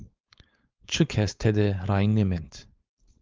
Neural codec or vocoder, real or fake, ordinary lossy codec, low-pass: codec, 16 kHz, 4.8 kbps, FACodec; fake; Opus, 32 kbps; 7.2 kHz